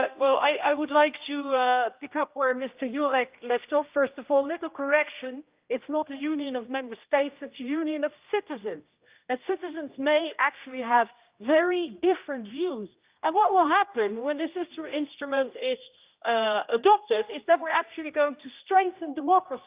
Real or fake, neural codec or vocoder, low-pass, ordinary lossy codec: fake; codec, 16 kHz, 1 kbps, X-Codec, HuBERT features, trained on general audio; 3.6 kHz; Opus, 24 kbps